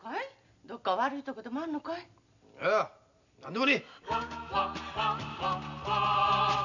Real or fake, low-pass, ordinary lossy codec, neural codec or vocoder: real; 7.2 kHz; none; none